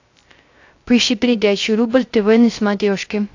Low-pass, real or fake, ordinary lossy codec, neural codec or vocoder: 7.2 kHz; fake; AAC, 48 kbps; codec, 16 kHz, 0.3 kbps, FocalCodec